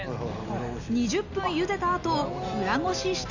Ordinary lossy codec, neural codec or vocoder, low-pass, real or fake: none; none; 7.2 kHz; real